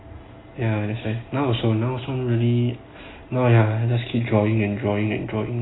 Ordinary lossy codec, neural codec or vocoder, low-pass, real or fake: AAC, 16 kbps; none; 7.2 kHz; real